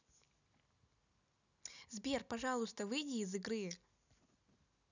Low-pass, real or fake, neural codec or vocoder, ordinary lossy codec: 7.2 kHz; real; none; none